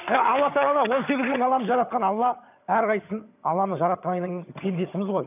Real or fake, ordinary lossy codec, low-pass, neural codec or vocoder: fake; none; 3.6 kHz; vocoder, 22.05 kHz, 80 mel bands, HiFi-GAN